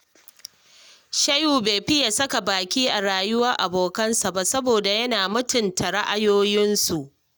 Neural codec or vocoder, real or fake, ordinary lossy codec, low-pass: none; real; none; none